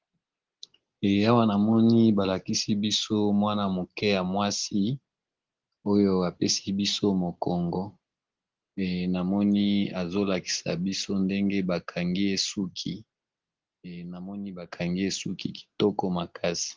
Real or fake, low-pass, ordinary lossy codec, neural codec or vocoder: real; 7.2 kHz; Opus, 16 kbps; none